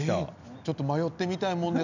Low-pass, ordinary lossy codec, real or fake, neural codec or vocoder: 7.2 kHz; none; real; none